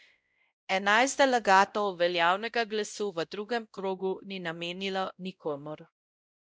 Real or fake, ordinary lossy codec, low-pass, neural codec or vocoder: fake; none; none; codec, 16 kHz, 0.5 kbps, X-Codec, WavLM features, trained on Multilingual LibriSpeech